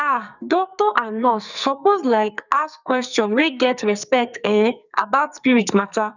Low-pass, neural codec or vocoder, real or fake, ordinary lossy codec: 7.2 kHz; codec, 44.1 kHz, 2.6 kbps, SNAC; fake; none